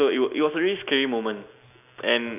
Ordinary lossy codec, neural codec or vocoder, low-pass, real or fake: none; none; 3.6 kHz; real